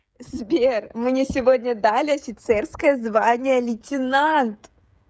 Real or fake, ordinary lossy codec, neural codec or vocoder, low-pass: fake; none; codec, 16 kHz, 8 kbps, FreqCodec, smaller model; none